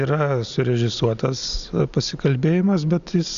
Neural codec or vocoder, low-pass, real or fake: none; 7.2 kHz; real